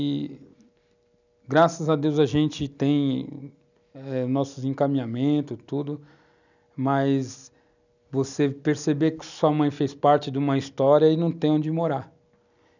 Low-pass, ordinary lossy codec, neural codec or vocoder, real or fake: 7.2 kHz; none; none; real